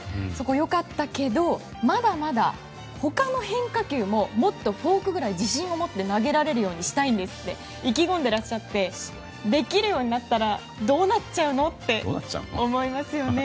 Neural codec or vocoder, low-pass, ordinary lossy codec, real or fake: none; none; none; real